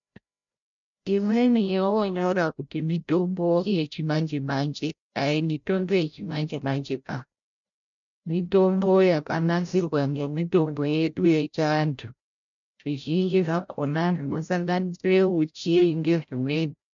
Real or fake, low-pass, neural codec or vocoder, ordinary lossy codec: fake; 7.2 kHz; codec, 16 kHz, 0.5 kbps, FreqCodec, larger model; MP3, 64 kbps